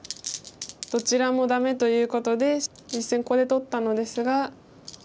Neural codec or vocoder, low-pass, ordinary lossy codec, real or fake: none; none; none; real